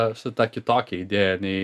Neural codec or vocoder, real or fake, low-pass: none; real; 14.4 kHz